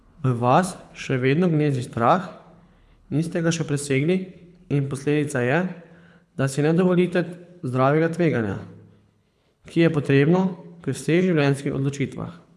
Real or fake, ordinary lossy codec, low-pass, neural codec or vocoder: fake; none; none; codec, 24 kHz, 6 kbps, HILCodec